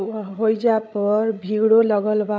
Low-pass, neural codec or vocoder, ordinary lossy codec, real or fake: none; codec, 16 kHz, 16 kbps, FreqCodec, larger model; none; fake